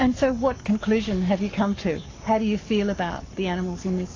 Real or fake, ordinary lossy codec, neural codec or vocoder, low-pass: fake; AAC, 32 kbps; codec, 44.1 kHz, 7.8 kbps, Pupu-Codec; 7.2 kHz